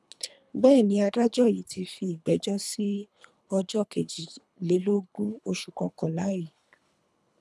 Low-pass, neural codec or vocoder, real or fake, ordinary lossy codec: none; codec, 24 kHz, 3 kbps, HILCodec; fake; none